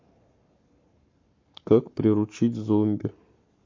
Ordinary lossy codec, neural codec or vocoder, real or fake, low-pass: MP3, 48 kbps; codec, 44.1 kHz, 7.8 kbps, Pupu-Codec; fake; 7.2 kHz